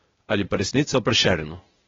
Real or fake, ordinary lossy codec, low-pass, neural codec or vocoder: fake; AAC, 24 kbps; 7.2 kHz; codec, 16 kHz, 0.8 kbps, ZipCodec